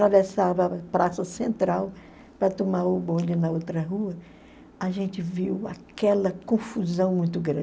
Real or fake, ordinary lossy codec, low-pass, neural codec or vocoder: real; none; none; none